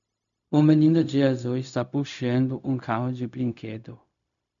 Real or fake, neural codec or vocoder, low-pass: fake; codec, 16 kHz, 0.4 kbps, LongCat-Audio-Codec; 7.2 kHz